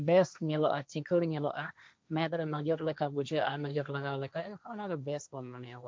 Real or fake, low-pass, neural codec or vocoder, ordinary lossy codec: fake; none; codec, 16 kHz, 1.1 kbps, Voila-Tokenizer; none